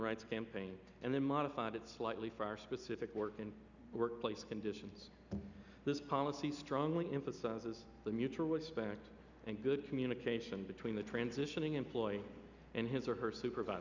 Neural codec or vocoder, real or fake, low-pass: none; real; 7.2 kHz